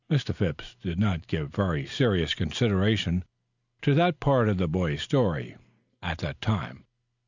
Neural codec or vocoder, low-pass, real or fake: none; 7.2 kHz; real